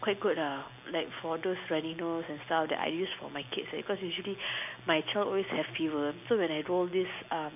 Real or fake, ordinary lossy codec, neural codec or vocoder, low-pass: real; none; none; 3.6 kHz